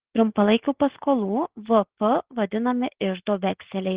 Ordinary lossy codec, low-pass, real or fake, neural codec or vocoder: Opus, 16 kbps; 3.6 kHz; real; none